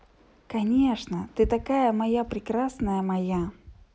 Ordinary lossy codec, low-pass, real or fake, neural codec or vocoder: none; none; real; none